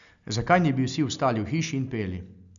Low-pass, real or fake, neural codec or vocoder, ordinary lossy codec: 7.2 kHz; real; none; none